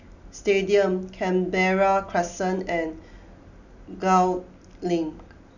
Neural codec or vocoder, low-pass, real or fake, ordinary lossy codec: none; 7.2 kHz; real; none